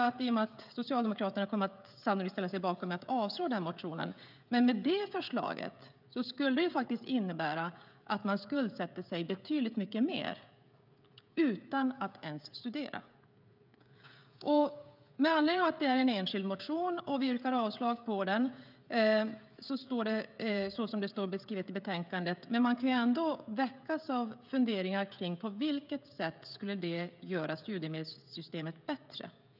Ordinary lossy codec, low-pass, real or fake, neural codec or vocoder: none; 5.4 kHz; fake; codec, 16 kHz, 16 kbps, FreqCodec, smaller model